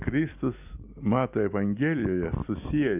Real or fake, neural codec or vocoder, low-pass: real; none; 3.6 kHz